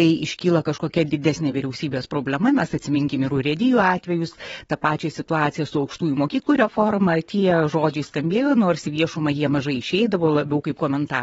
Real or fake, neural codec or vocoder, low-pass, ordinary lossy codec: fake; vocoder, 22.05 kHz, 80 mel bands, WaveNeXt; 9.9 kHz; AAC, 24 kbps